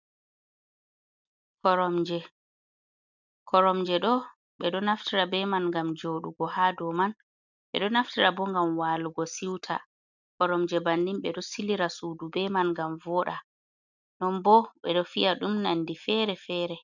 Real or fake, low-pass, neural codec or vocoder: real; 7.2 kHz; none